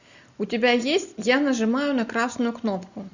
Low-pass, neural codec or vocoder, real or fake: 7.2 kHz; none; real